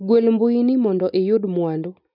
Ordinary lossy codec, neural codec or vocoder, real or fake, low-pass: none; none; real; 5.4 kHz